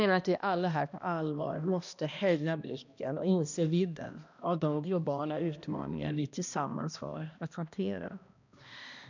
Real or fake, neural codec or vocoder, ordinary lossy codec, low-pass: fake; codec, 16 kHz, 1 kbps, X-Codec, HuBERT features, trained on balanced general audio; none; 7.2 kHz